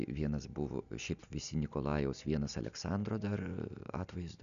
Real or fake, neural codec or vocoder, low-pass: real; none; 7.2 kHz